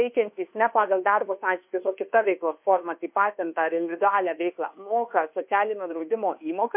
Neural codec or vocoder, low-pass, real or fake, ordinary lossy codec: codec, 24 kHz, 1.2 kbps, DualCodec; 3.6 kHz; fake; MP3, 32 kbps